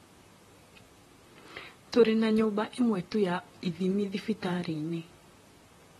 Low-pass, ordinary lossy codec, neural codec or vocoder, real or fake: 19.8 kHz; AAC, 32 kbps; vocoder, 44.1 kHz, 128 mel bands, Pupu-Vocoder; fake